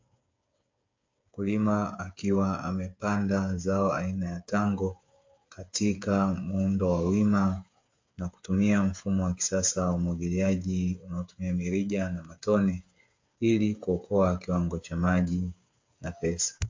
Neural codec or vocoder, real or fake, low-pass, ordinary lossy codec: codec, 16 kHz, 8 kbps, FreqCodec, smaller model; fake; 7.2 kHz; MP3, 48 kbps